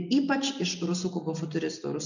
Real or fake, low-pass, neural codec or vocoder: real; 7.2 kHz; none